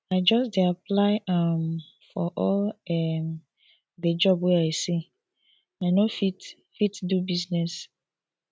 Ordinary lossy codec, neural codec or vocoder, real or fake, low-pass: none; none; real; none